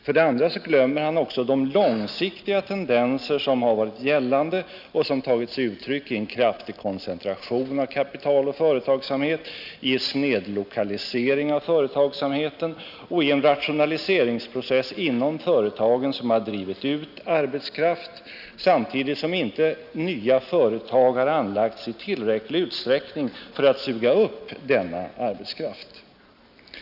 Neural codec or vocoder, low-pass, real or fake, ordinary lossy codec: none; 5.4 kHz; real; none